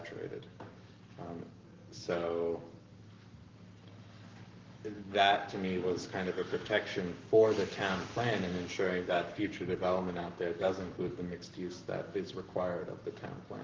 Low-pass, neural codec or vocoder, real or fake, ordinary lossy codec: 7.2 kHz; codec, 44.1 kHz, 7.8 kbps, Pupu-Codec; fake; Opus, 32 kbps